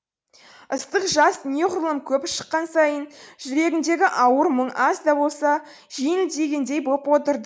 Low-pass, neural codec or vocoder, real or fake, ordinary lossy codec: none; none; real; none